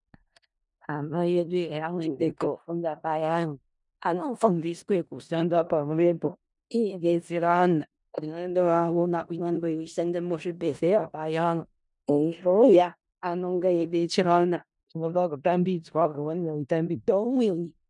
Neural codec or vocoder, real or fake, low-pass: codec, 16 kHz in and 24 kHz out, 0.4 kbps, LongCat-Audio-Codec, four codebook decoder; fake; 10.8 kHz